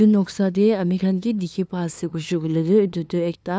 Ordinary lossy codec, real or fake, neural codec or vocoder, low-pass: none; fake; codec, 16 kHz, 2 kbps, FunCodec, trained on LibriTTS, 25 frames a second; none